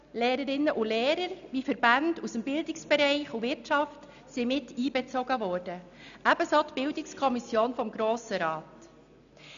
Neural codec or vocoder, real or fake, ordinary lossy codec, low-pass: none; real; none; 7.2 kHz